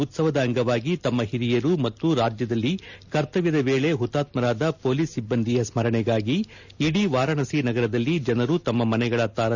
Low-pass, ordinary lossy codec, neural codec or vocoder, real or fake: 7.2 kHz; none; none; real